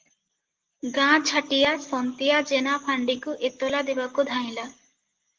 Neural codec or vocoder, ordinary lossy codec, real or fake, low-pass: none; Opus, 16 kbps; real; 7.2 kHz